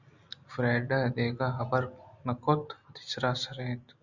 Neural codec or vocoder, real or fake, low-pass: none; real; 7.2 kHz